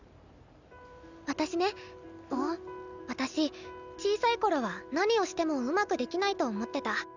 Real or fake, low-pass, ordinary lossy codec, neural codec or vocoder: real; 7.2 kHz; none; none